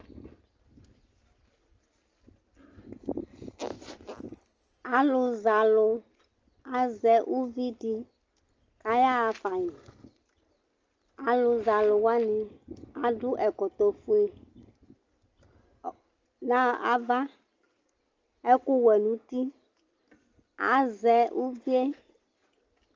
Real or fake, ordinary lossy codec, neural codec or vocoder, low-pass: real; Opus, 24 kbps; none; 7.2 kHz